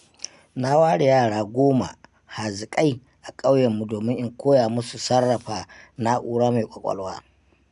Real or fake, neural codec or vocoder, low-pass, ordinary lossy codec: real; none; 10.8 kHz; none